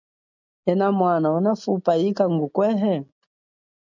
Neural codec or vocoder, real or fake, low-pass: none; real; 7.2 kHz